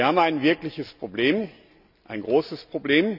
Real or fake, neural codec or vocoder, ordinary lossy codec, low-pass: real; none; none; 5.4 kHz